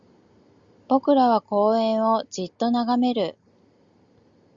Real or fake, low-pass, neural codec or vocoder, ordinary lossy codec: real; 7.2 kHz; none; Opus, 64 kbps